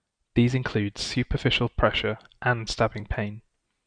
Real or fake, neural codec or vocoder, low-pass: real; none; 9.9 kHz